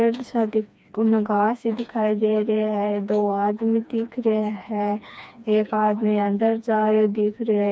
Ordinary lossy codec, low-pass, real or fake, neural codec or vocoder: none; none; fake; codec, 16 kHz, 2 kbps, FreqCodec, smaller model